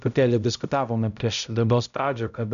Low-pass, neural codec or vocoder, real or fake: 7.2 kHz; codec, 16 kHz, 0.5 kbps, X-Codec, HuBERT features, trained on balanced general audio; fake